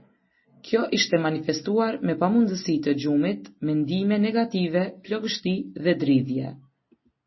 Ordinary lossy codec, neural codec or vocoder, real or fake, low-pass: MP3, 24 kbps; none; real; 7.2 kHz